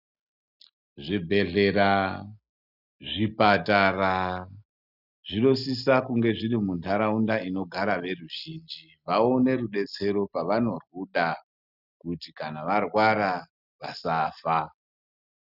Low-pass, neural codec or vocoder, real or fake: 5.4 kHz; none; real